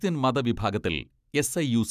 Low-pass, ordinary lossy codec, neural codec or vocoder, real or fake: 14.4 kHz; none; none; real